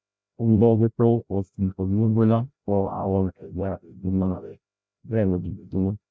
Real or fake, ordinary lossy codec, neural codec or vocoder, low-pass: fake; none; codec, 16 kHz, 0.5 kbps, FreqCodec, larger model; none